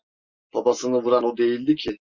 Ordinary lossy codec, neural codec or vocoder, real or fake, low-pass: Opus, 64 kbps; none; real; 7.2 kHz